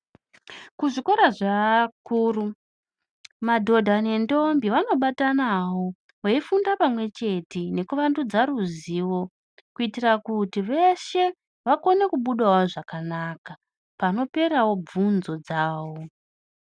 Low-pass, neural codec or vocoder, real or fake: 9.9 kHz; none; real